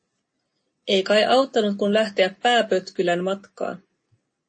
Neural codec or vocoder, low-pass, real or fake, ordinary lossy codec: none; 9.9 kHz; real; MP3, 32 kbps